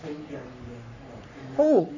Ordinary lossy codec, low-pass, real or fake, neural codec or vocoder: none; 7.2 kHz; fake; codec, 44.1 kHz, 3.4 kbps, Pupu-Codec